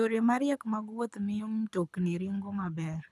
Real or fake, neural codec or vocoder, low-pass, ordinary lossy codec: fake; codec, 24 kHz, 6 kbps, HILCodec; none; none